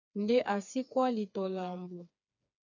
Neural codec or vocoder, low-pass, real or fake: codec, 16 kHz, 4 kbps, FreqCodec, smaller model; 7.2 kHz; fake